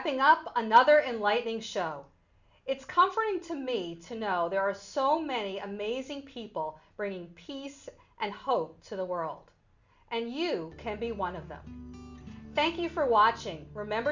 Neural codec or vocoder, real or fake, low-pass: none; real; 7.2 kHz